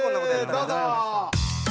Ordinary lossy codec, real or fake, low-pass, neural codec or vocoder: none; real; none; none